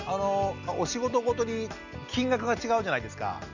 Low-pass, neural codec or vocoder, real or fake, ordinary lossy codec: 7.2 kHz; none; real; none